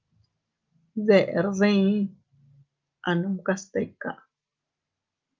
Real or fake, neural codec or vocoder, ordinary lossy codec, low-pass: real; none; Opus, 24 kbps; 7.2 kHz